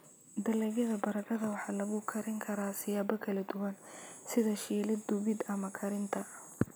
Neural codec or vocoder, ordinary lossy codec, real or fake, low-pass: none; none; real; none